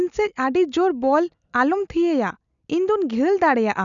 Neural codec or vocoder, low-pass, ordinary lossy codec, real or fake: none; 7.2 kHz; none; real